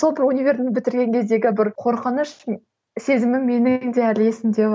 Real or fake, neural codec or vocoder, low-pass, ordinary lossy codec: real; none; none; none